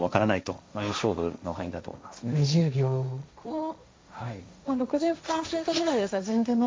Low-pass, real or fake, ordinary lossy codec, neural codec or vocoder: none; fake; none; codec, 16 kHz, 1.1 kbps, Voila-Tokenizer